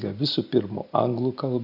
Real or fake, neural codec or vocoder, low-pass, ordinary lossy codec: real; none; 5.4 kHz; AAC, 48 kbps